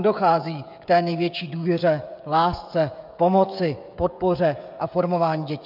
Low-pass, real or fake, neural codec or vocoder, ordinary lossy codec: 5.4 kHz; fake; codec, 16 kHz, 16 kbps, FreqCodec, smaller model; MP3, 48 kbps